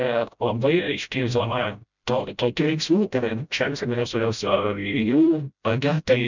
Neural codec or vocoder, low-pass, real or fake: codec, 16 kHz, 0.5 kbps, FreqCodec, smaller model; 7.2 kHz; fake